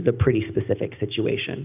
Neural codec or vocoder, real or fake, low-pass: none; real; 3.6 kHz